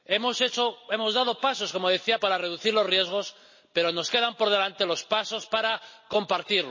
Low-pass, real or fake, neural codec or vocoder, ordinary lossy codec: 7.2 kHz; real; none; MP3, 32 kbps